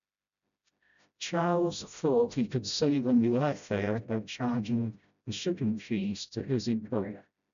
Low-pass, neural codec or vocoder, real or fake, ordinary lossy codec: 7.2 kHz; codec, 16 kHz, 0.5 kbps, FreqCodec, smaller model; fake; MP3, 96 kbps